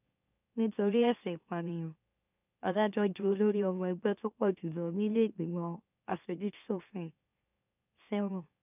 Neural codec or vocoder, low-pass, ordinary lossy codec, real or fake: autoencoder, 44.1 kHz, a latent of 192 numbers a frame, MeloTTS; 3.6 kHz; none; fake